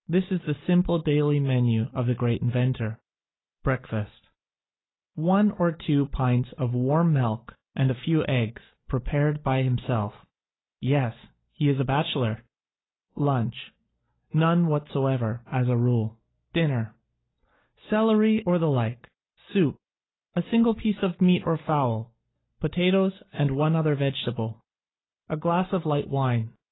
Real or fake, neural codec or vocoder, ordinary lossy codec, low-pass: real; none; AAC, 16 kbps; 7.2 kHz